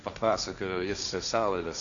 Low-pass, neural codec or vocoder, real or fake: 7.2 kHz; codec, 16 kHz, 1.1 kbps, Voila-Tokenizer; fake